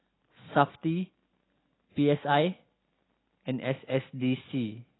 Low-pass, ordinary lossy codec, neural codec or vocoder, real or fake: 7.2 kHz; AAC, 16 kbps; none; real